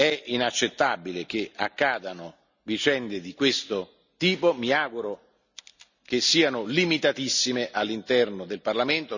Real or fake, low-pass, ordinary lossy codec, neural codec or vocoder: real; 7.2 kHz; none; none